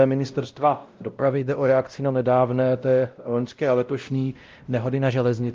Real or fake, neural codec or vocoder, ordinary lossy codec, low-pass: fake; codec, 16 kHz, 0.5 kbps, X-Codec, WavLM features, trained on Multilingual LibriSpeech; Opus, 32 kbps; 7.2 kHz